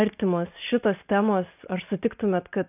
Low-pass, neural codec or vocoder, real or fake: 3.6 kHz; none; real